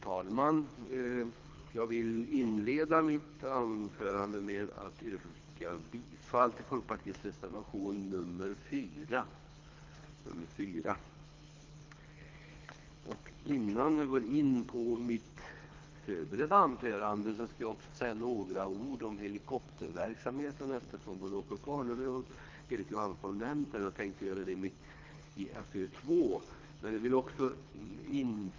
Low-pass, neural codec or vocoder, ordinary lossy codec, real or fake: 7.2 kHz; codec, 24 kHz, 3 kbps, HILCodec; Opus, 24 kbps; fake